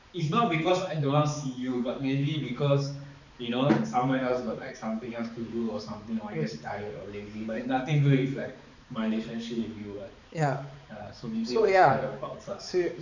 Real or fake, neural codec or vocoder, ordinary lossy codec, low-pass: fake; codec, 16 kHz, 4 kbps, X-Codec, HuBERT features, trained on balanced general audio; none; 7.2 kHz